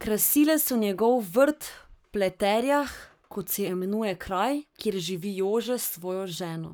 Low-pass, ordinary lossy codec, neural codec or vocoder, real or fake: none; none; codec, 44.1 kHz, 7.8 kbps, Pupu-Codec; fake